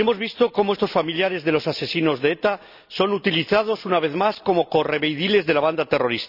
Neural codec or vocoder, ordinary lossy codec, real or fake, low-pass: none; none; real; 5.4 kHz